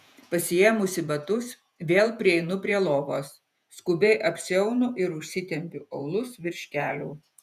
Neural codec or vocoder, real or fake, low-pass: vocoder, 48 kHz, 128 mel bands, Vocos; fake; 14.4 kHz